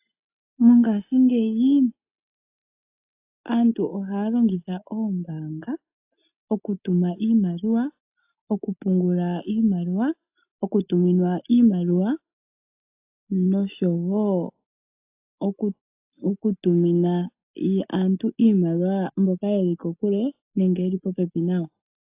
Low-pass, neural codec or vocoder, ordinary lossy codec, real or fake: 3.6 kHz; none; AAC, 32 kbps; real